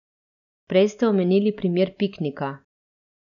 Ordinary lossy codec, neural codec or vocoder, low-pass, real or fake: none; none; 7.2 kHz; real